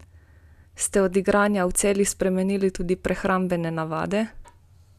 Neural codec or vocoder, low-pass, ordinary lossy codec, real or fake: none; 14.4 kHz; none; real